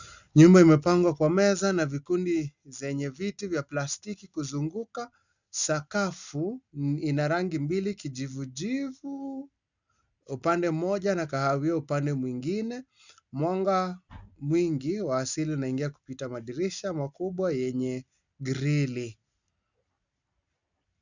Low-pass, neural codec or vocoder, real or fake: 7.2 kHz; none; real